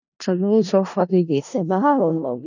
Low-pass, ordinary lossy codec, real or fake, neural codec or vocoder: 7.2 kHz; none; fake; codec, 16 kHz in and 24 kHz out, 0.4 kbps, LongCat-Audio-Codec, four codebook decoder